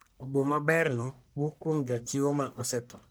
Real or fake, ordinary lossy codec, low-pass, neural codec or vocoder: fake; none; none; codec, 44.1 kHz, 1.7 kbps, Pupu-Codec